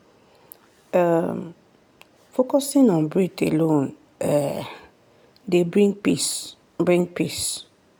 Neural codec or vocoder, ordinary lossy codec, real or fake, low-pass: none; none; real; none